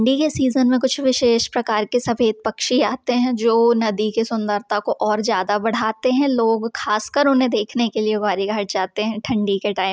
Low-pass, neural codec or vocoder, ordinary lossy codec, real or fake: none; none; none; real